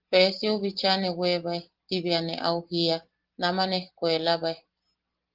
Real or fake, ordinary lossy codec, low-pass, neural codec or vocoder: real; Opus, 16 kbps; 5.4 kHz; none